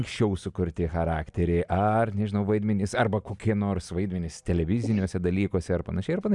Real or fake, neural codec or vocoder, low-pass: real; none; 10.8 kHz